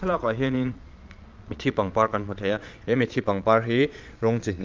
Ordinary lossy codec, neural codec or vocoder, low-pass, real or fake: Opus, 24 kbps; vocoder, 22.05 kHz, 80 mel bands, Vocos; 7.2 kHz; fake